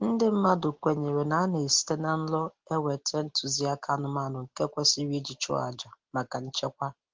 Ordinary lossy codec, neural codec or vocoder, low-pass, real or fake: Opus, 16 kbps; none; 7.2 kHz; real